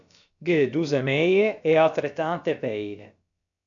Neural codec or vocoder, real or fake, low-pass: codec, 16 kHz, about 1 kbps, DyCAST, with the encoder's durations; fake; 7.2 kHz